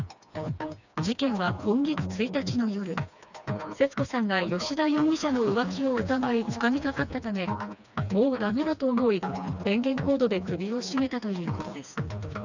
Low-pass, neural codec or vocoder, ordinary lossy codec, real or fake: 7.2 kHz; codec, 16 kHz, 2 kbps, FreqCodec, smaller model; none; fake